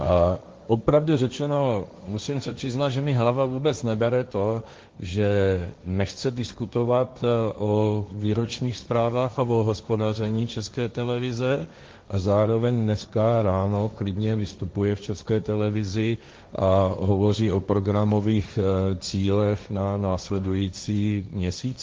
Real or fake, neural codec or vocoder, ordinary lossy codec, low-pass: fake; codec, 16 kHz, 1.1 kbps, Voila-Tokenizer; Opus, 32 kbps; 7.2 kHz